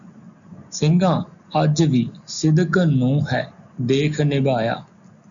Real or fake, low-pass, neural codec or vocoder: real; 7.2 kHz; none